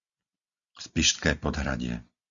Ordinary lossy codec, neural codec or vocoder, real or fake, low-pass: Opus, 64 kbps; none; real; 7.2 kHz